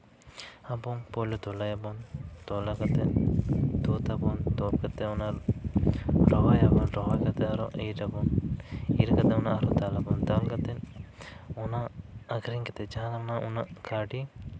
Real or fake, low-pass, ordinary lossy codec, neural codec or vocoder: real; none; none; none